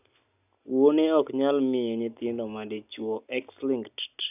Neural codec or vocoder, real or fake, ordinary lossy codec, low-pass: none; real; none; 3.6 kHz